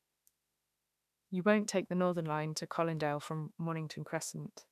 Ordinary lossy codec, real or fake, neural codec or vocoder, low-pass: none; fake; autoencoder, 48 kHz, 32 numbers a frame, DAC-VAE, trained on Japanese speech; 14.4 kHz